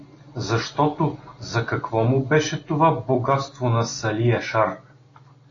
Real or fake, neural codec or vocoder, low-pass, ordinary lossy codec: real; none; 7.2 kHz; AAC, 32 kbps